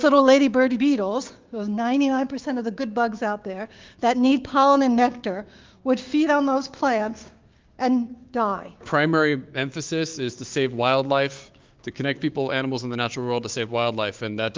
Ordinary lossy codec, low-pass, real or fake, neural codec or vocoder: Opus, 32 kbps; 7.2 kHz; fake; codec, 16 kHz, 6 kbps, DAC